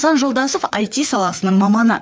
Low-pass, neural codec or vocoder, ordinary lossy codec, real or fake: none; codec, 16 kHz, 4 kbps, FreqCodec, larger model; none; fake